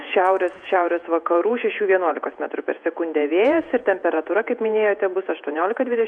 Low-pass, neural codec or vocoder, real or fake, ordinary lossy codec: 9.9 kHz; vocoder, 48 kHz, 128 mel bands, Vocos; fake; MP3, 96 kbps